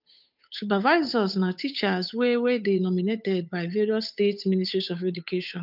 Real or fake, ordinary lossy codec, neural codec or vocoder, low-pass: fake; none; codec, 16 kHz, 8 kbps, FunCodec, trained on Chinese and English, 25 frames a second; 5.4 kHz